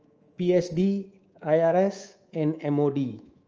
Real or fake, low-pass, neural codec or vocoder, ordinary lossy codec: fake; 7.2 kHz; codec, 24 kHz, 3.1 kbps, DualCodec; Opus, 24 kbps